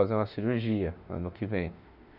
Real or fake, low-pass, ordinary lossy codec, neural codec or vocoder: fake; 5.4 kHz; none; autoencoder, 48 kHz, 32 numbers a frame, DAC-VAE, trained on Japanese speech